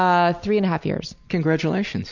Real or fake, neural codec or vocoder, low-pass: real; none; 7.2 kHz